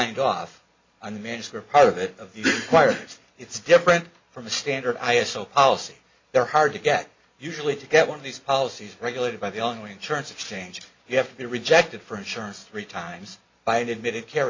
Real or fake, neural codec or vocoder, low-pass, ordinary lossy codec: real; none; 7.2 kHz; MP3, 64 kbps